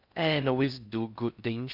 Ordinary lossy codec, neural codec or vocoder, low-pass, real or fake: none; codec, 16 kHz in and 24 kHz out, 0.6 kbps, FocalCodec, streaming, 4096 codes; 5.4 kHz; fake